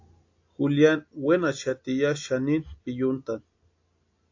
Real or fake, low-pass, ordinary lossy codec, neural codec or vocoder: real; 7.2 kHz; AAC, 48 kbps; none